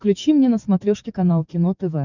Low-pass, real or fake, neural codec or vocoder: 7.2 kHz; fake; codec, 44.1 kHz, 7.8 kbps, DAC